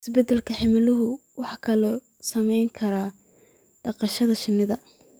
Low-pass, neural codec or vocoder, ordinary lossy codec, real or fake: none; codec, 44.1 kHz, 7.8 kbps, DAC; none; fake